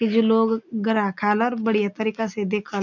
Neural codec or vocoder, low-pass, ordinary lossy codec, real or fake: none; 7.2 kHz; none; real